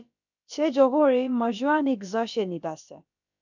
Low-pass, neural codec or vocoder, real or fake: 7.2 kHz; codec, 16 kHz, about 1 kbps, DyCAST, with the encoder's durations; fake